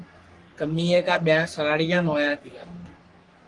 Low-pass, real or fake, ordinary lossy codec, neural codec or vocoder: 10.8 kHz; fake; Opus, 24 kbps; codec, 44.1 kHz, 3.4 kbps, Pupu-Codec